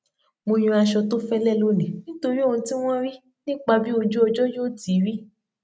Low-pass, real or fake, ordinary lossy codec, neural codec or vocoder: none; real; none; none